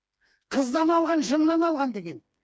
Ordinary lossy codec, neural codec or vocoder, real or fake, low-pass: none; codec, 16 kHz, 2 kbps, FreqCodec, smaller model; fake; none